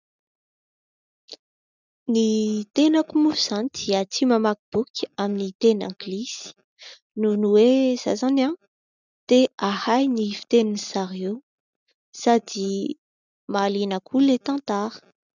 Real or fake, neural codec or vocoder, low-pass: real; none; 7.2 kHz